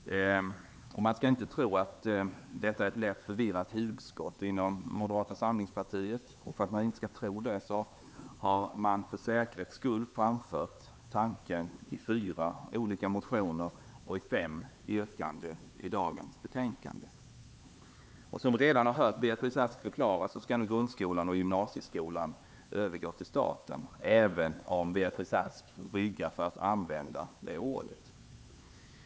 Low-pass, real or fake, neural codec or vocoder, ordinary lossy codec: none; fake; codec, 16 kHz, 4 kbps, X-Codec, HuBERT features, trained on LibriSpeech; none